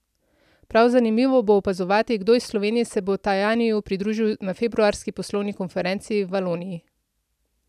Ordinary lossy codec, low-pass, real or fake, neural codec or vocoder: none; 14.4 kHz; real; none